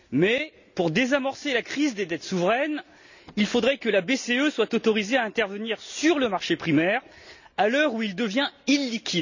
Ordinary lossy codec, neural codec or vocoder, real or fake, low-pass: none; none; real; 7.2 kHz